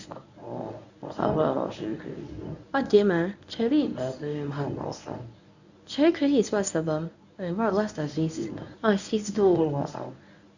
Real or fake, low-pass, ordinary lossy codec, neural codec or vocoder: fake; 7.2 kHz; none; codec, 24 kHz, 0.9 kbps, WavTokenizer, medium speech release version 1